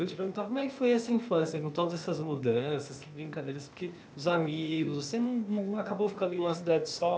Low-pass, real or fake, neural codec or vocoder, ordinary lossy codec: none; fake; codec, 16 kHz, 0.8 kbps, ZipCodec; none